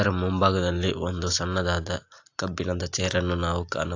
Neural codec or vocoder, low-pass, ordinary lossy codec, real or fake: none; 7.2 kHz; AAC, 48 kbps; real